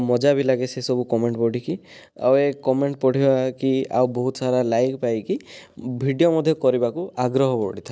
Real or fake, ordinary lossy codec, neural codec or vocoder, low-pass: real; none; none; none